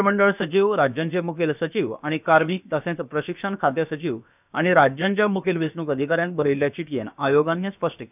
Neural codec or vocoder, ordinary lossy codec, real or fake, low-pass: codec, 16 kHz, about 1 kbps, DyCAST, with the encoder's durations; none; fake; 3.6 kHz